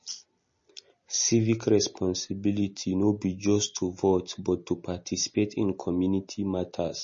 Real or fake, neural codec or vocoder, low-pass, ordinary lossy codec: real; none; 7.2 kHz; MP3, 32 kbps